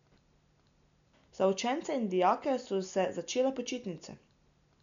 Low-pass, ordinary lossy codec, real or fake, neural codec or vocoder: 7.2 kHz; none; real; none